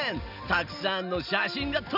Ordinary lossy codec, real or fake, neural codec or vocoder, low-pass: none; real; none; 5.4 kHz